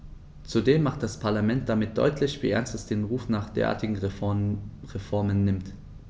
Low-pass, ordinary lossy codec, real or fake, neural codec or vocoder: none; none; real; none